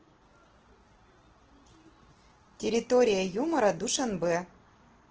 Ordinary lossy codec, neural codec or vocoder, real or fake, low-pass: Opus, 16 kbps; none; real; 7.2 kHz